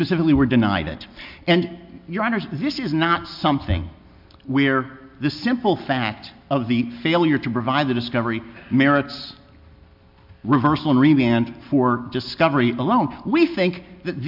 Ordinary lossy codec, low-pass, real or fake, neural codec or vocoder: MP3, 48 kbps; 5.4 kHz; real; none